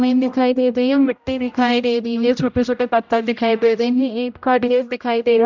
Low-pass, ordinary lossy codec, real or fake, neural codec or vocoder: 7.2 kHz; none; fake; codec, 16 kHz, 0.5 kbps, X-Codec, HuBERT features, trained on general audio